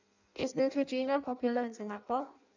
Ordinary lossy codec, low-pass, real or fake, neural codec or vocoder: none; 7.2 kHz; fake; codec, 16 kHz in and 24 kHz out, 0.6 kbps, FireRedTTS-2 codec